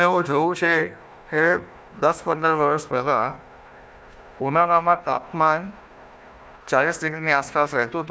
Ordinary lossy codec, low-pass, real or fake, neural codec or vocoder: none; none; fake; codec, 16 kHz, 1 kbps, FunCodec, trained on Chinese and English, 50 frames a second